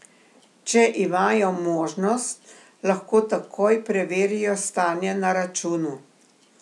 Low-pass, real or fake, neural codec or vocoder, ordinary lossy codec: none; real; none; none